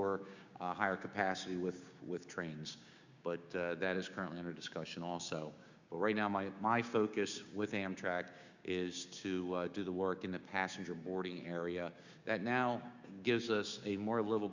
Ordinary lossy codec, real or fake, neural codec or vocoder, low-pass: Opus, 64 kbps; fake; codec, 16 kHz, 6 kbps, DAC; 7.2 kHz